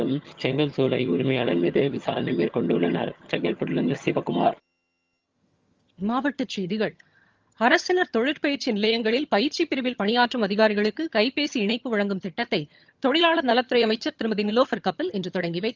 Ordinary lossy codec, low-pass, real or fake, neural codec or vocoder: Opus, 32 kbps; 7.2 kHz; fake; vocoder, 22.05 kHz, 80 mel bands, HiFi-GAN